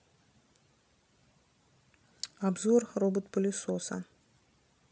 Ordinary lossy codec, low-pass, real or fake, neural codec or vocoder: none; none; real; none